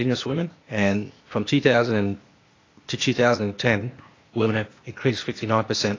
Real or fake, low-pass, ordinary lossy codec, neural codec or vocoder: fake; 7.2 kHz; AAC, 32 kbps; codec, 16 kHz, 0.8 kbps, ZipCodec